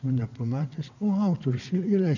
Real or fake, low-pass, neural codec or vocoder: fake; 7.2 kHz; vocoder, 22.05 kHz, 80 mel bands, WaveNeXt